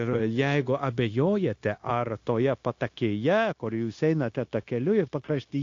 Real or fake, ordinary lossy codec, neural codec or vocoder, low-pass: fake; AAC, 48 kbps; codec, 16 kHz, 0.9 kbps, LongCat-Audio-Codec; 7.2 kHz